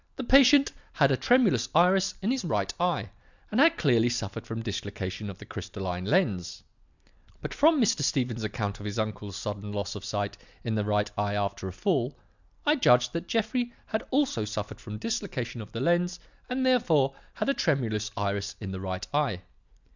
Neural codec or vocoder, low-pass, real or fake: none; 7.2 kHz; real